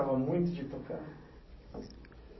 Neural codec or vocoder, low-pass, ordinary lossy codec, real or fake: none; 7.2 kHz; MP3, 24 kbps; real